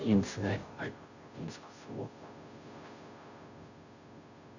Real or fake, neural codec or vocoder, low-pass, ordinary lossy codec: fake; codec, 16 kHz, 0.5 kbps, FunCodec, trained on Chinese and English, 25 frames a second; 7.2 kHz; none